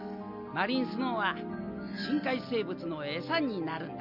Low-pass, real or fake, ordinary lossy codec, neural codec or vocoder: 5.4 kHz; real; none; none